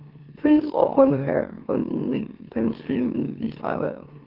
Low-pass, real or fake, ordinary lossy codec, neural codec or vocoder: 5.4 kHz; fake; Opus, 16 kbps; autoencoder, 44.1 kHz, a latent of 192 numbers a frame, MeloTTS